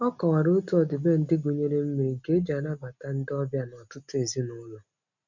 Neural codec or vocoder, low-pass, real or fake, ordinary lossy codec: none; 7.2 kHz; real; none